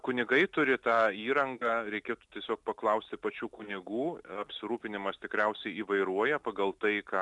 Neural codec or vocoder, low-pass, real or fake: none; 10.8 kHz; real